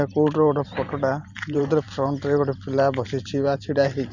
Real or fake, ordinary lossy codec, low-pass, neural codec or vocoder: fake; none; 7.2 kHz; vocoder, 44.1 kHz, 128 mel bands every 512 samples, BigVGAN v2